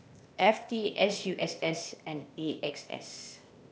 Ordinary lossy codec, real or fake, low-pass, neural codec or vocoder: none; fake; none; codec, 16 kHz, 0.8 kbps, ZipCodec